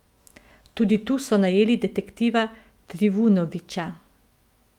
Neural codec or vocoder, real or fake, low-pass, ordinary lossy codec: autoencoder, 48 kHz, 128 numbers a frame, DAC-VAE, trained on Japanese speech; fake; 19.8 kHz; Opus, 32 kbps